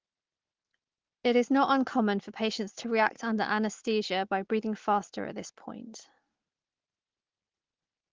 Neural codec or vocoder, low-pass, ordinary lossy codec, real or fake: none; 7.2 kHz; Opus, 16 kbps; real